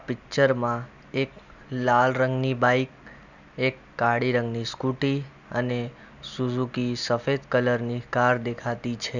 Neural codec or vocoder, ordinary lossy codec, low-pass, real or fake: none; none; 7.2 kHz; real